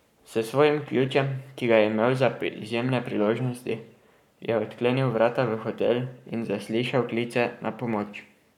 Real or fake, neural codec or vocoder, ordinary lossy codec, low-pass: fake; codec, 44.1 kHz, 7.8 kbps, Pupu-Codec; none; 19.8 kHz